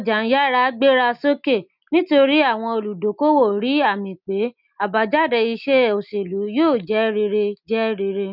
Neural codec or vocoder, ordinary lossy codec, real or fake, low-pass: none; none; real; 5.4 kHz